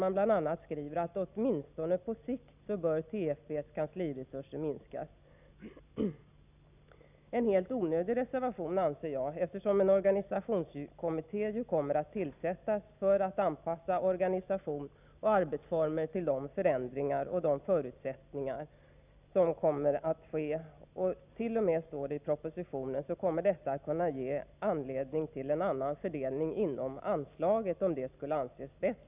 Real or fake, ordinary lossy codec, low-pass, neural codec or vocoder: real; none; 3.6 kHz; none